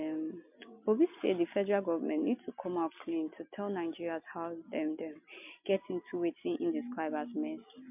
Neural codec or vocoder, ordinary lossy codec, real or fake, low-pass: none; MP3, 32 kbps; real; 3.6 kHz